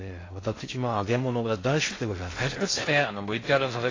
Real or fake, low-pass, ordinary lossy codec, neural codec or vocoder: fake; 7.2 kHz; AAC, 32 kbps; codec, 16 kHz in and 24 kHz out, 0.6 kbps, FocalCodec, streaming, 2048 codes